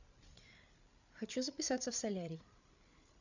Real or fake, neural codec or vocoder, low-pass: fake; codec, 16 kHz, 8 kbps, FreqCodec, larger model; 7.2 kHz